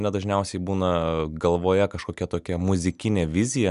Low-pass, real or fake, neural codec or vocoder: 10.8 kHz; real; none